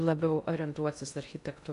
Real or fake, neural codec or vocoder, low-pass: fake; codec, 16 kHz in and 24 kHz out, 0.6 kbps, FocalCodec, streaming, 2048 codes; 10.8 kHz